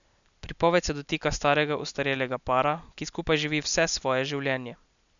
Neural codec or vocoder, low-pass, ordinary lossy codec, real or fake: none; 7.2 kHz; none; real